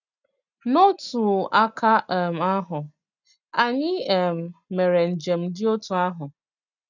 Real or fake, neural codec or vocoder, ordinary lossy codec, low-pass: real; none; none; 7.2 kHz